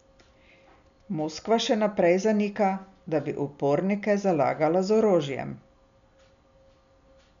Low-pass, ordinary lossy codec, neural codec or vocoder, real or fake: 7.2 kHz; none; none; real